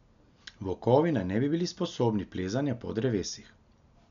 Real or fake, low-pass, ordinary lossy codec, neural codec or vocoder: real; 7.2 kHz; none; none